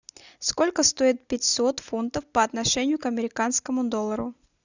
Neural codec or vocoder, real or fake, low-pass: none; real; 7.2 kHz